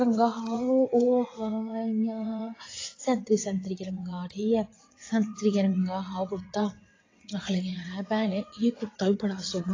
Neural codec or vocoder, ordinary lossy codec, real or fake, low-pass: vocoder, 22.05 kHz, 80 mel bands, WaveNeXt; AAC, 32 kbps; fake; 7.2 kHz